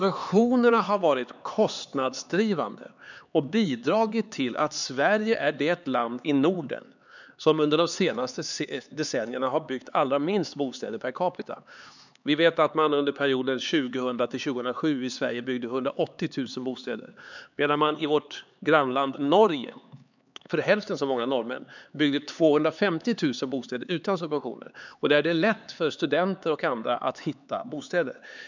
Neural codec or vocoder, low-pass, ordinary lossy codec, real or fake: codec, 16 kHz, 4 kbps, X-Codec, HuBERT features, trained on LibriSpeech; 7.2 kHz; none; fake